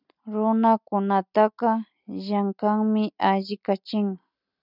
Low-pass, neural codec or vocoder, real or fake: 5.4 kHz; none; real